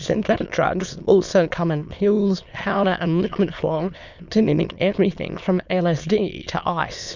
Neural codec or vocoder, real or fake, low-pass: autoencoder, 22.05 kHz, a latent of 192 numbers a frame, VITS, trained on many speakers; fake; 7.2 kHz